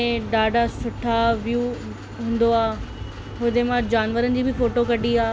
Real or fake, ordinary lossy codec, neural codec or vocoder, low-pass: real; none; none; none